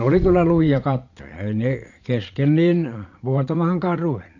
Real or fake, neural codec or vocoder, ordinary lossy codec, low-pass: fake; vocoder, 22.05 kHz, 80 mel bands, Vocos; AAC, 48 kbps; 7.2 kHz